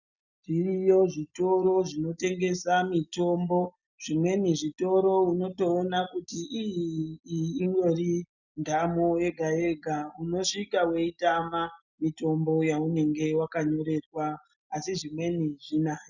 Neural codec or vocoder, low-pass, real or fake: none; 7.2 kHz; real